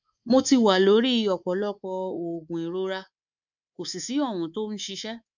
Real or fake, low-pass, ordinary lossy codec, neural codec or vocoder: fake; 7.2 kHz; none; codec, 24 kHz, 3.1 kbps, DualCodec